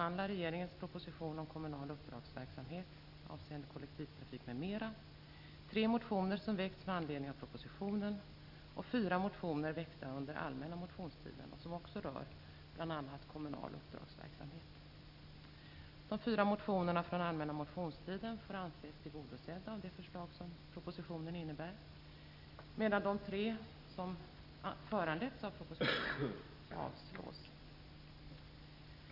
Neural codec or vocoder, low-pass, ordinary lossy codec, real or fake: none; 5.4 kHz; none; real